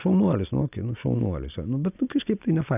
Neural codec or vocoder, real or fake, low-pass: none; real; 3.6 kHz